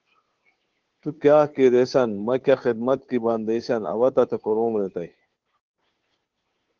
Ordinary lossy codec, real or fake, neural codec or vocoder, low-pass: Opus, 16 kbps; fake; codec, 16 kHz, 2 kbps, FunCodec, trained on Chinese and English, 25 frames a second; 7.2 kHz